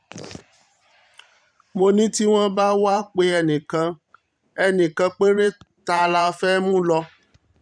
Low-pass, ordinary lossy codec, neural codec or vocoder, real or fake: 9.9 kHz; none; vocoder, 44.1 kHz, 128 mel bands every 512 samples, BigVGAN v2; fake